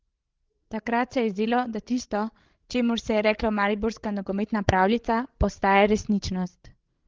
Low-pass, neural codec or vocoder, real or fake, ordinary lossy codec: 7.2 kHz; codec, 16 kHz, 16 kbps, FreqCodec, larger model; fake; Opus, 16 kbps